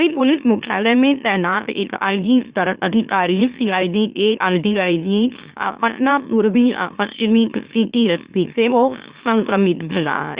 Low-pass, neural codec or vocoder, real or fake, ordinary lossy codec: 3.6 kHz; autoencoder, 44.1 kHz, a latent of 192 numbers a frame, MeloTTS; fake; Opus, 64 kbps